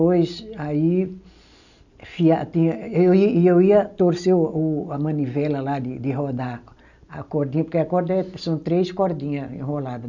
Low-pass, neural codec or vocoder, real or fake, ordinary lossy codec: 7.2 kHz; none; real; none